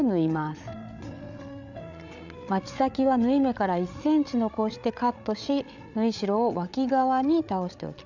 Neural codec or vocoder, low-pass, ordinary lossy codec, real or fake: codec, 16 kHz, 8 kbps, FreqCodec, larger model; 7.2 kHz; none; fake